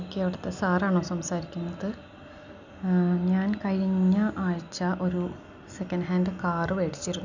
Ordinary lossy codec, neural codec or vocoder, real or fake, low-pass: none; none; real; 7.2 kHz